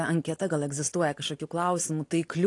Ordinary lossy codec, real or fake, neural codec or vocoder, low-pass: AAC, 48 kbps; real; none; 10.8 kHz